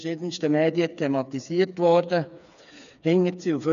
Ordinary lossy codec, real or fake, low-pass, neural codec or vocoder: none; fake; 7.2 kHz; codec, 16 kHz, 4 kbps, FreqCodec, smaller model